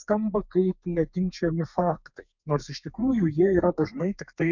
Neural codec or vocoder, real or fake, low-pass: codec, 32 kHz, 1.9 kbps, SNAC; fake; 7.2 kHz